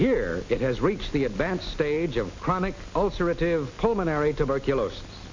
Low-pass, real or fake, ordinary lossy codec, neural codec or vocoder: 7.2 kHz; real; MP3, 64 kbps; none